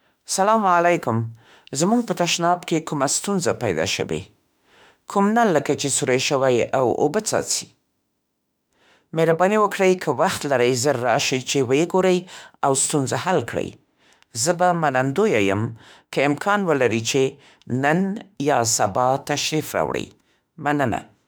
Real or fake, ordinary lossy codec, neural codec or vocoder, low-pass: fake; none; autoencoder, 48 kHz, 32 numbers a frame, DAC-VAE, trained on Japanese speech; none